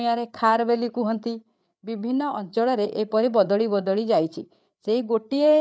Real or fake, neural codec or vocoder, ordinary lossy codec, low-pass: fake; codec, 16 kHz, 8 kbps, FreqCodec, larger model; none; none